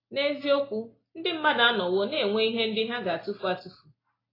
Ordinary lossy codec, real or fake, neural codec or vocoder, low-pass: AAC, 24 kbps; real; none; 5.4 kHz